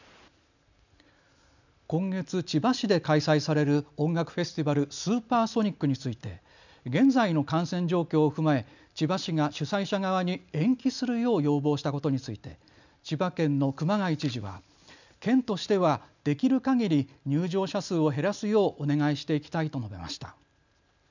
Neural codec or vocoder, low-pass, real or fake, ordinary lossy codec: none; 7.2 kHz; real; none